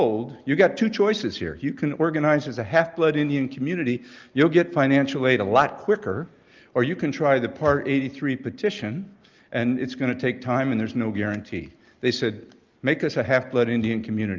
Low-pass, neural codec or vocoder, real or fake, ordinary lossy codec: 7.2 kHz; none; real; Opus, 24 kbps